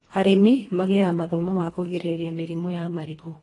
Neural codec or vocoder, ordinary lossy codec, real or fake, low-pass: codec, 24 kHz, 1.5 kbps, HILCodec; AAC, 32 kbps; fake; 10.8 kHz